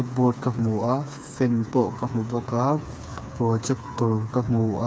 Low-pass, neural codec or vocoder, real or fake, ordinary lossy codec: none; codec, 16 kHz, 4 kbps, FreqCodec, smaller model; fake; none